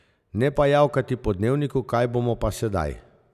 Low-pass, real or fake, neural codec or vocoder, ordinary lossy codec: 14.4 kHz; real; none; none